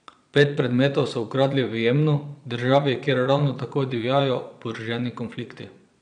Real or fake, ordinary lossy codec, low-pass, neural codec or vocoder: fake; none; 9.9 kHz; vocoder, 22.05 kHz, 80 mel bands, Vocos